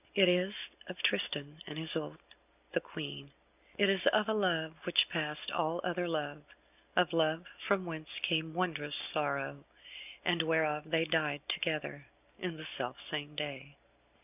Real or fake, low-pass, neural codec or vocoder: real; 3.6 kHz; none